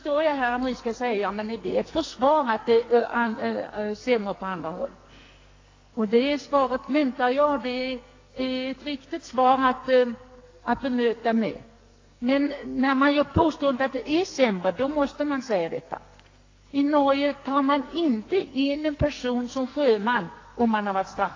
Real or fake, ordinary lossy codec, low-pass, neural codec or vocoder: fake; AAC, 32 kbps; 7.2 kHz; codec, 44.1 kHz, 2.6 kbps, SNAC